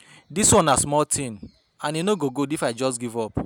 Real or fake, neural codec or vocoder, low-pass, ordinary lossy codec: real; none; none; none